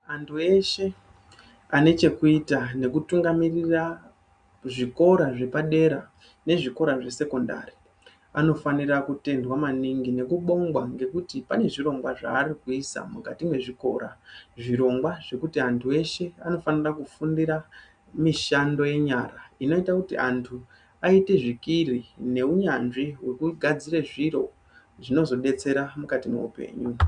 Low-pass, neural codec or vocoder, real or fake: 9.9 kHz; none; real